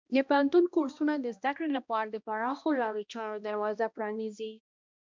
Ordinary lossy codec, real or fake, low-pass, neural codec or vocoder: MP3, 64 kbps; fake; 7.2 kHz; codec, 16 kHz, 1 kbps, X-Codec, HuBERT features, trained on balanced general audio